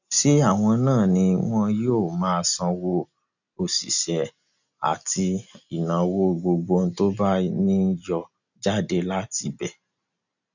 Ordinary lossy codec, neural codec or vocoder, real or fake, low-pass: none; none; real; 7.2 kHz